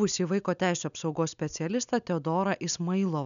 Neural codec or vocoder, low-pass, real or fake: none; 7.2 kHz; real